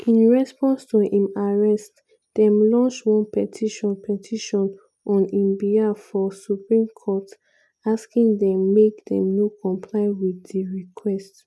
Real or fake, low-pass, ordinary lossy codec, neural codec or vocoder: real; none; none; none